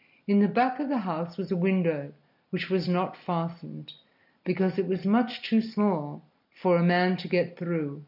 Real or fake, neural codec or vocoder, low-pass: real; none; 5.4 kHz